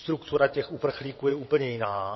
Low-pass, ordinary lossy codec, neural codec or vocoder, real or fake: 7.2 kHz; MP3, 24 kbps; vocoder, 22.05 kHz, 80 mel bands, WaveNeXt; fake